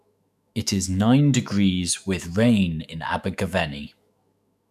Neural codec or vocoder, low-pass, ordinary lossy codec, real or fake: autoencoder, 48 kHz, 128 numbers a frame, DAC-VAE, trained on Japanese speech; 14.4 kHz; none; fake